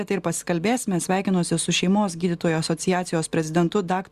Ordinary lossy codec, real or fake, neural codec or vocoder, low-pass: Opus, 64 kbps; real; none; 14.4 kHz